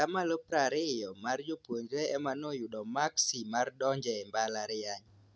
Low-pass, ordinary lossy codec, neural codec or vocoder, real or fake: none; none; none; real